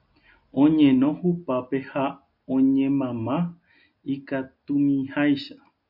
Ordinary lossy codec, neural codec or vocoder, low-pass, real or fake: MP3, 48 kbps; none; 5.4 kHz; real